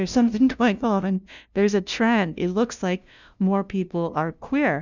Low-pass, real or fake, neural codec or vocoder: 7.2 kHz; fake; codec, 16 kHz, 0.5 kbps, FunCodec, trained on LibriTTS, 25 frames a second